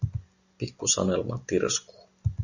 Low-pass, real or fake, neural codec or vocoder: 7.2 kHz; real; none